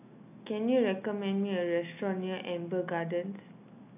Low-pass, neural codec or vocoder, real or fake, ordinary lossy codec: 3.6 kHz; none; real; AAC, 32 kbps